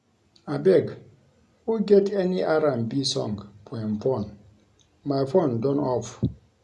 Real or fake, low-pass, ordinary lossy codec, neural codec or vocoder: real; none; none; none